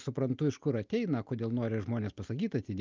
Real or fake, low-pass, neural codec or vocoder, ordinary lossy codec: real; 7.2 kHz; none; Opus, 24 kbps